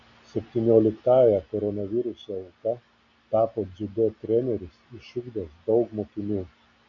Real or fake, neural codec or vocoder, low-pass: real; none; 7.2 kHz